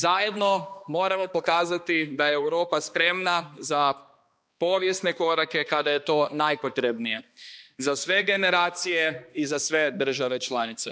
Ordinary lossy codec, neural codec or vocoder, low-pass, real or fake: none; codec, 16 kHz, 2 kbps, X-Codec, HuBERT features, trained on balanced general audio; none; fake